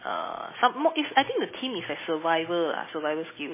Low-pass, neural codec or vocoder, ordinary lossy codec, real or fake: 3.6 kHz; none; MP3, 16 kbps; real